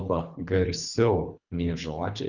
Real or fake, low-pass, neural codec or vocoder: fake; 7.2 kHz; codec, 24 kHz, 3 kbps, HILCodec